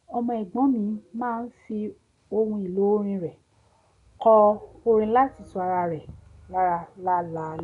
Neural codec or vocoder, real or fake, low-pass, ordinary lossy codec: none; real; 10.8 kHz; none